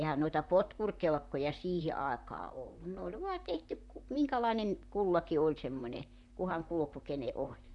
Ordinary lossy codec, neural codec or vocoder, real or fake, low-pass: none; vocoder, 44.1 kHz, 128 mel bands, Pupu-Vocoder; fake; 10.8 kHz